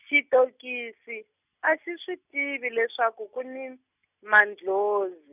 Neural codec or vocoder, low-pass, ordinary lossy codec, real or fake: none; 3.6 kHz; none; real